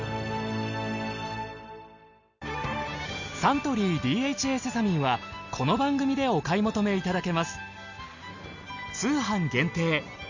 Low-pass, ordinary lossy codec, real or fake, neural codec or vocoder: 7.2 kHz; Opus, 64 kbps; real; none